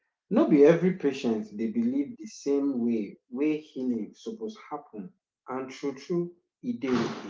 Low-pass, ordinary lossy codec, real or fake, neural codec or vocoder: none; none; real; none